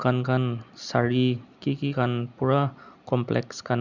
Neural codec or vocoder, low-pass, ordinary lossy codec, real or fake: none; 7.2 kHz; none; real